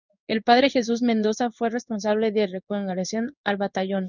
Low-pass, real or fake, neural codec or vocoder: 7.2 kHz; fake; codec, 16 kHz in and 24 kHz out, 1 kbps, XY-Tokenizer